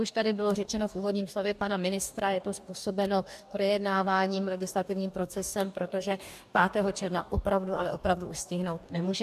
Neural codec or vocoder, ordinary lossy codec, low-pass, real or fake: codec, 44.1 kHz, 2.6 kbps, DAC; AAC, 96 kbps; 14.4 kHz; fake